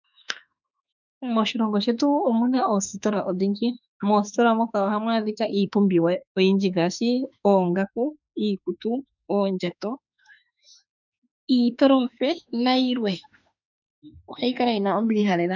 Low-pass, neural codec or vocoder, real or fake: 7.2 kHz; autoencoder, 48 kHz, 32 numbers a frame, DAC-VAE, trained on Japanese speech; fake